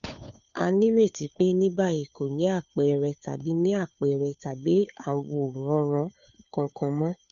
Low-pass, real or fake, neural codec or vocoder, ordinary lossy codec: 7.2 kHz; fake; codec, 16 kHz, 2 kbps, FunCodec, trained on Chinese and English, 25 frames a second; none